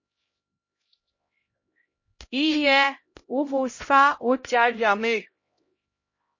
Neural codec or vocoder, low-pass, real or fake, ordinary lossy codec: codec, 16 kHz, 0.5 kbps, X-Codec, HuBERT features, trained on LibriSpeech; 7.2 kHz; fake; MP3, 32 kbps